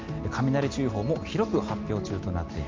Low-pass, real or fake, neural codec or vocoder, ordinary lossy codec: 7.2 kHz; real; none; Opus, 24 kbps